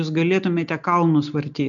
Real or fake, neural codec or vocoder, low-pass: real; none; 7.2 kHz